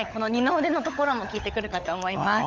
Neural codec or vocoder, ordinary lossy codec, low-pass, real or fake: codec, 16 kHz, 16 kbps, FunCodec, trained on LibriTTS, 50 frames a second; Opus, 32 kbps; 7.2 kHz; fake